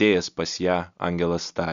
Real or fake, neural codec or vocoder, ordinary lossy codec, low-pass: real; none; MP3, 96 kbps; 7.2 kHz